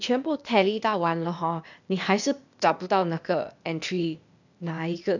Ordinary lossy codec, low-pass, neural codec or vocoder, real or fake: none; 7.2 kHz; codec, 16 kHz, 0.8 kbps, ZipCodec; fake